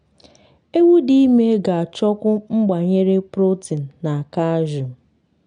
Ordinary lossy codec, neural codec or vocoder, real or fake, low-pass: none; none; real; 9.9 kHz